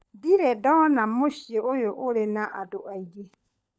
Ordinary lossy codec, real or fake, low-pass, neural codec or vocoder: none; fake; none; codec, 16 kHz, 16 kbps, FunCodec, trained on LibriTTS, 50 frames a second